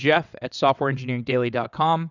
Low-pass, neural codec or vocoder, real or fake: 7.2 kHz; vocoder, 22.05 kHz, 80 mel bands, WaveNeXt; fake